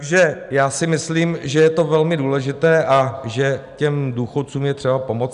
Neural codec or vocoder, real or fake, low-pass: none; real; 10.8 kHz